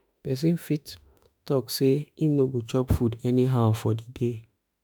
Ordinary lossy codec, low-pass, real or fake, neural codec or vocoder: none; none; fake; autoencoder, 48 kHz, 32 numbers a frame, DAC-VAE, trained on Japanese speech